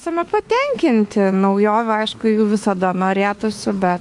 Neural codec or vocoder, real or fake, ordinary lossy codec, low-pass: autoencoder, 48 kHz, 32 numbers a frame, DAC-VAE, trained on Japanese speech; fake; AAC, 64 kbps; 10.8 kHz